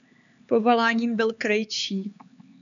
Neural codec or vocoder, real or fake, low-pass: codec, 16 kHz, 4 kbps, X-Codec, HuBERT features, trained on LibriSpeech; fake; 7.2 kHz